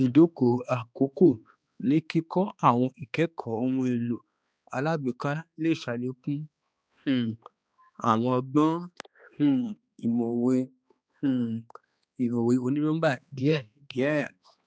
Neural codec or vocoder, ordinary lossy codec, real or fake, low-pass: codec, 16 kHz, 2 kbps, X-Codec, HuBERT features, trained on balanced general audio; none; fake; none